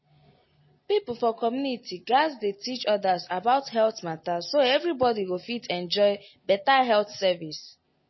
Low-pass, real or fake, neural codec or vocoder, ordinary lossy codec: 7.2 kHz; real; none; MP3, 24 kbps